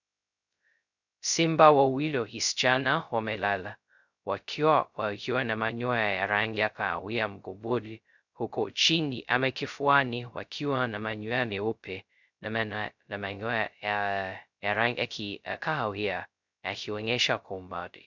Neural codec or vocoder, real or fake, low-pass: codec, 16 kHz, 0.2 kbps, FocalCodec; fake; 7.2 kHz